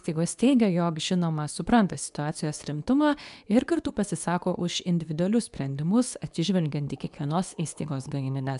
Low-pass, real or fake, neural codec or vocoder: 10.8 kHz; fake; codec, 24 kHz, 0.9 kbps, WavTokenizer, medium speech release version 2